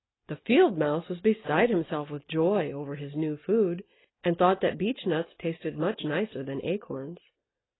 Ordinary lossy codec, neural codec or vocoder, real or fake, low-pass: AAC, 16 kbps; none; real; 7.2 kHz